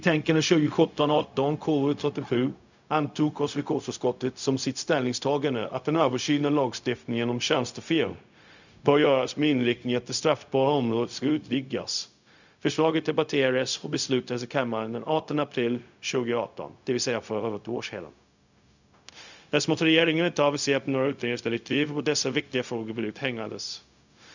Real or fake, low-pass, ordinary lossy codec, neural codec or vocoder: fake; 7.2 kHz; none; codec, 16 kHz, 0.4 kbps, LongCat-Audio-Codec